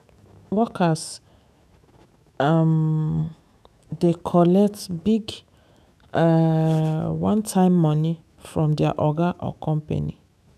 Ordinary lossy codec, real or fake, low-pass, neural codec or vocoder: none; fake; 14.4 kHz; autoencoder, 48 kHz, 128 numbers a frame, DAC-VAE, trained on Japanese speech